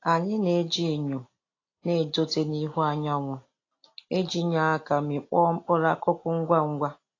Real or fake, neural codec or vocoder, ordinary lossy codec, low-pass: real; none; AAC, 32 kbps; 7.2 kHz